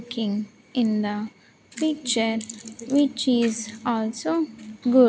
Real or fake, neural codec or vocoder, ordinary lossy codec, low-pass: real; none; none; none